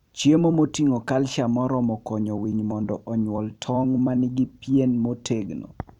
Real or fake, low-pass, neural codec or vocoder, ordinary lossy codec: fake; 19.8 kHz; vocoder, 44.1 kHz, 128 mel bands every 256 samples, BigVGAN v2; none